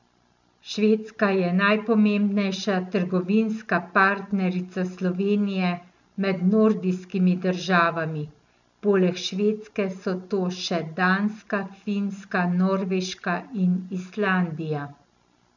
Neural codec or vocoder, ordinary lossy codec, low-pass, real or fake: none; none; 7.2 kHz; real